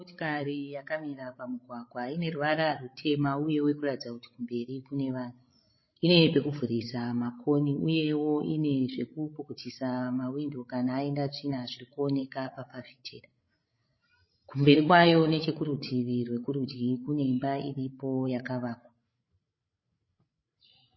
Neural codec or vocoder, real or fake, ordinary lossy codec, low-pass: codec, 16 kHz, 16 kbps, FreqCodec, larger model; fake; MP3, 24 kbps; 7.2 kHz